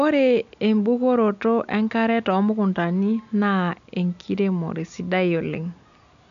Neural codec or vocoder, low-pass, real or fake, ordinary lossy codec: none; 7.2 kHz; real; none